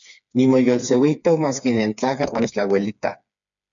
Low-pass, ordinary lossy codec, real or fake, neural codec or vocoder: 7.2 kHz; MP3, 64 kbps; fake; codec, 16 kHz, 4 kbps, FreqCodec, smaller model